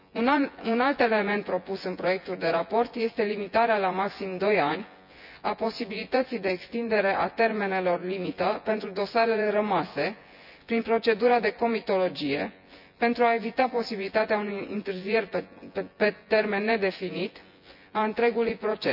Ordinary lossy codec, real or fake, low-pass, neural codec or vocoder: none; fake; 5.4 kHz; vocoder, 24 kHz, 100 mel bands, Vocos